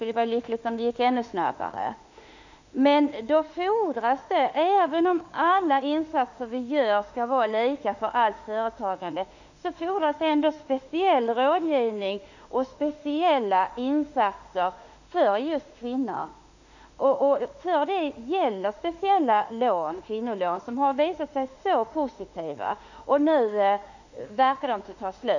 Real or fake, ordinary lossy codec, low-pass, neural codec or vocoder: fake; none; 7.2 kHz; autoencoder, 48 kHz, 32 numbers a frame, DAC-VAE, trained on Japanese speech